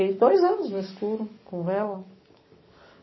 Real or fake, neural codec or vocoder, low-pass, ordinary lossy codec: fake; codec, 44.1 kHz, 7.8 kbps, DAC; 7.2 kHz; MP3, 24 kbps